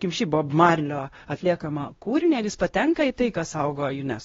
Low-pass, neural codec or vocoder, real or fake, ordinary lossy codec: 7.2 kHz; codec, 16 kHz, 1 kbps, X-Codec, WavLM features, trained on Multilingual LibriSpeech; fake; AAC, 24 kbps